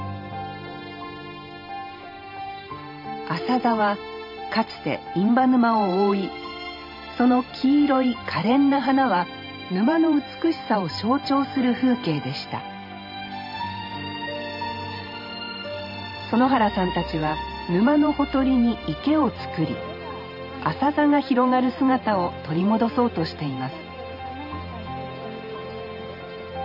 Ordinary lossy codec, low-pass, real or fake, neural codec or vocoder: none; 5.4 kHz; fake; vocoder, 44.1 kHz, 128 mel bands every 512 samples, BigVGAN v2